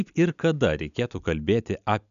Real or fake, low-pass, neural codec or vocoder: real; 7.2 kHz; none